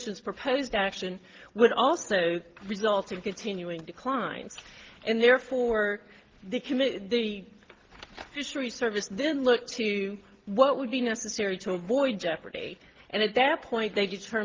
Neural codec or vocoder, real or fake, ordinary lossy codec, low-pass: none; real; Opus, 16 kbps; 7.2 kHz